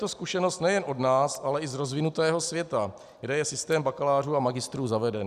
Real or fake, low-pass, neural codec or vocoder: real; 14.4 kHz; none